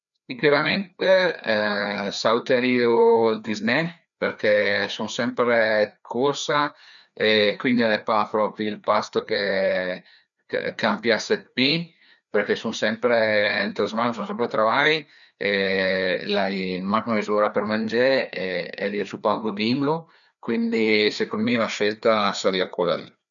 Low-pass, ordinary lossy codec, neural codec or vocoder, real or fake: 7.2 kHz; none; codec, 16 kHz, 2 kbps, FreqCodec, larger model; fake